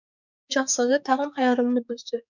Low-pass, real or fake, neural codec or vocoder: 7.2 kHz; fake; codec, 16 kHz, 4 kbps, X-Codec, HuBERT features, trained on balanced general audio